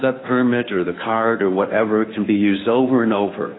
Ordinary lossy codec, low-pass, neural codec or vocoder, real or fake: AAC, 16 kbps; 7.2 kHz; codec, 16 kHz, 2 kbps, FunCodec, trained on Chinese and English, 25 frames a second; fake